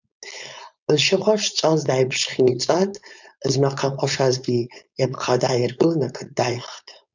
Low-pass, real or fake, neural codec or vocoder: 7.2 kHz; fake; codec, 16 kHz, 4.8 kbps, FACodec